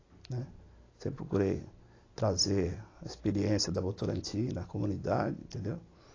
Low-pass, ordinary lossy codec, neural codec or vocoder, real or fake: 7.2 kHz; AAC, 32 kbps; none; real